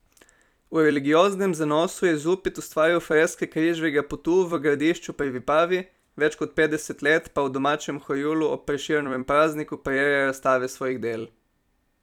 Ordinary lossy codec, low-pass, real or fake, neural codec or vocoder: none; 19.8 kHz; fake; vocoder, 44.1 kHz, 128 mel bands every 256 samples, BigVGAN v2